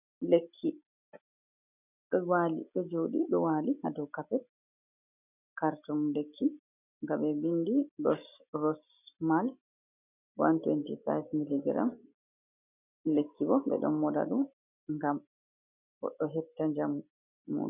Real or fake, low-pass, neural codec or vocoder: real; 3.6 kHz; none